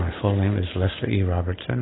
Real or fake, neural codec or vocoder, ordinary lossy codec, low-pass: real; none; AAC, 16 kbps; 7.2 kHz